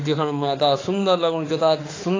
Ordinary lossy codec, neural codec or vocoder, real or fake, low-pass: AAC, 32 kbps; autoencoder, 48 kHz, 32 numbers a frame, DAC-VAE, trained on Japanese speech; fake; 7.2 kHz